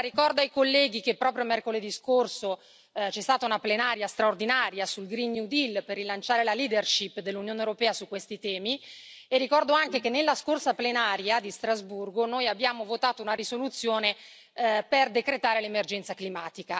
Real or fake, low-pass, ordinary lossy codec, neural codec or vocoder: real; none; none; none